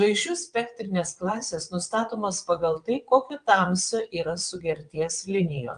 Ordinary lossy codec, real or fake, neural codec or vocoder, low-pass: Opus, 32 kbps; fake; vocoder, 22.05 kHz, 80 mel bands, WaveNeXt; 9.9 kHz